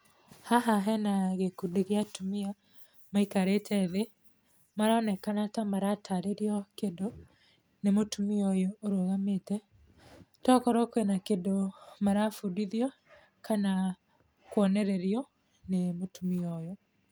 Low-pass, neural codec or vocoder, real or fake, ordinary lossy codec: none; none; real; none